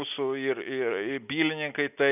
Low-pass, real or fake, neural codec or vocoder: 3.6 kHz; real; none